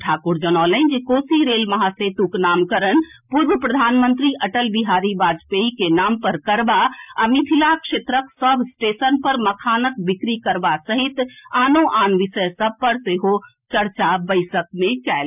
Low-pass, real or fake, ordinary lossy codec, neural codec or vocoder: 3.6 kHz; real; none; none